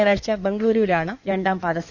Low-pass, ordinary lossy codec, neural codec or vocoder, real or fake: 7.2 kHz; none; codec, 16 kHz in and 24 kHz out, 2.2 kbps, FireRedTTS-2 codec; fake